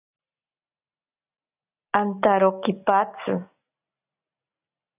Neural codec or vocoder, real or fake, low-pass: none; real; 3.6 kHz